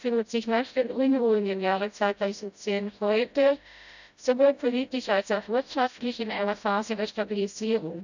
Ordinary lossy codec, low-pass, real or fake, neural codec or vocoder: none; 7.2 kHz; fake; codec, 16 kHz, 0.5 kbps, FreqCodec, smaller model